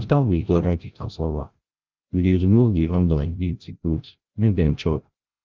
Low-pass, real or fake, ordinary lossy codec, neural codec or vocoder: 7.2 kHz; fake; Opus, 32 kbps; codec, 16 kHz, 0.5 kbps, FreqCodec, larger model